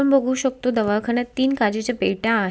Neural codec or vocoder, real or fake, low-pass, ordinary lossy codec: none; real; none; none